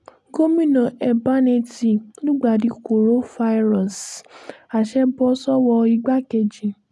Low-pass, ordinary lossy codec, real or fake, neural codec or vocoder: none; none; real; none